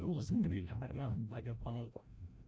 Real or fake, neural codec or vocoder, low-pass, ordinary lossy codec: fake; codec, 16 kHz, 0.5 kbps, FreqCodec, larger model; none; none